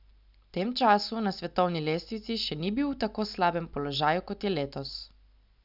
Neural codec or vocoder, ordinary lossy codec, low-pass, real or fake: none; none; 5.4 kHz; real